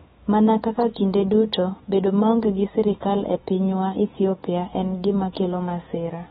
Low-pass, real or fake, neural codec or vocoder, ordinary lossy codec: 19.8 kHz; fake; autoencoder, 48 kHz, 128 numbers a frame, DAC-VAE, trained on Japanese speech; AAC, 16 kbps